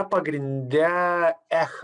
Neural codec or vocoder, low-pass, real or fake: none; 9.9 kHz; real